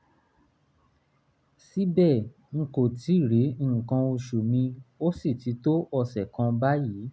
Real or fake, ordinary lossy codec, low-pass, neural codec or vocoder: real; none; none; none